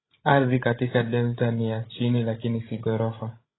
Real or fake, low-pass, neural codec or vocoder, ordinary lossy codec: fake; 7.2 kHz; codec, 16 kHz, 16 kbps, FreqCodec, larger model; AAC, 16 kbps